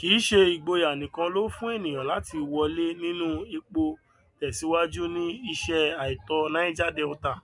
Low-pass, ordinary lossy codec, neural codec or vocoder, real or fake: 10.8 kHz; MP3, 64 kbps; none; real